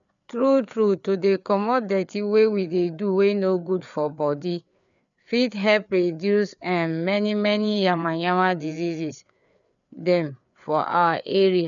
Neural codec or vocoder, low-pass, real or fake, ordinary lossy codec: codec, 16 kHz, 4 kbps, FreqCodec, larger model; 7.2 kHz; fake; none